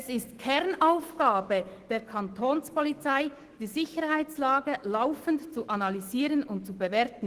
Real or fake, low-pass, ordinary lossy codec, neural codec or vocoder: fake; 14.4 kHz; Opus, 16 kbps; autoencoder, 48 kHz, 128 numbers a frame, DAC-VAE, trained on Japanese speech